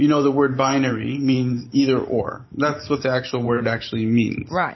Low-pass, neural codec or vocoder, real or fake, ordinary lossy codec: 7.2 kHz; vocoder, 44.1 kHz, 128 mel bands every 512 samples, BigVGAN v2; fake; MP3, 24 kbps